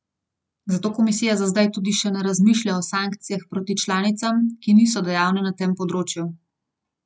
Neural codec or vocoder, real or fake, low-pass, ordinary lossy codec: none; real; none; none